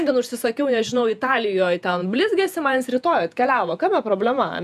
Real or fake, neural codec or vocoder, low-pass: fake; vocoder, 48 kHz, 128 mel bands, Vocos; 14.4 kHz